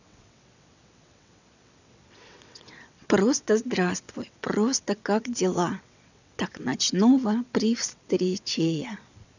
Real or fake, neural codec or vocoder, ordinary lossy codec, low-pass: fake; vocoder, 22.05 kHz, 80 mel bands, WaveNeXt; none; 7.2 kHz